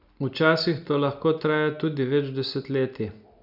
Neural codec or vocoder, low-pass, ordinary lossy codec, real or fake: none; 5.4 kHz; none; real